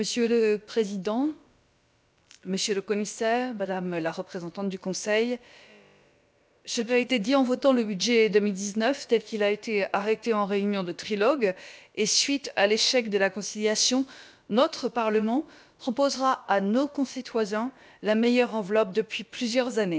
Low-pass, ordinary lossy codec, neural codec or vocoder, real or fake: none; none; codec, 16 kHz, about 1 kbps, DyCAST, with the encoder's durations; fake